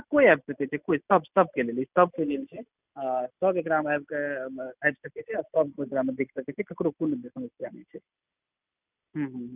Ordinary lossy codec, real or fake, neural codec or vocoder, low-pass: none; real; none; 3.6 kHz